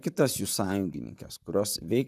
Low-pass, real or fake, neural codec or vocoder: 14.4 kHz; fake; vocoder, 44.1 kHz, 128 mel bands, Pupu-Vocoder